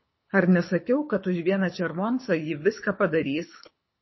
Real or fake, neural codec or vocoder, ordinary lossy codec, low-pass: fake; codec, 24 kHz, 6 kbps, HILCodec; MP3, 24 kbps; 7.2 kHz